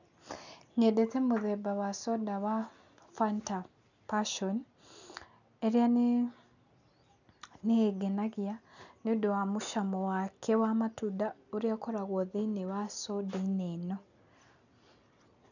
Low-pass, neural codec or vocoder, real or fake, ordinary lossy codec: 7.2 kHz; none; real; none